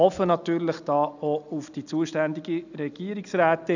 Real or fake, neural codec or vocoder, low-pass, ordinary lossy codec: real; none; 7.2 kHz; none